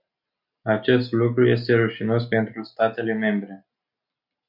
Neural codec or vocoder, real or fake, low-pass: none; real; 5.4 kHz